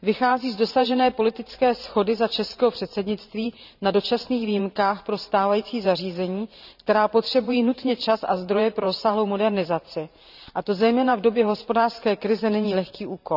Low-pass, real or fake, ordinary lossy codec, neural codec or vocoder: 5.4 kHz; fake; none; vocoder, 44.1 kHz, 128 mel bands every 512 samples, BigVGAN v2